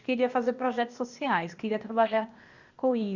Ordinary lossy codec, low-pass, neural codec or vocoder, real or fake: Opus, 64 kbps; 7.2 kHz; codec, 16 kHz, 0.8 kbps, ZipCodec; fake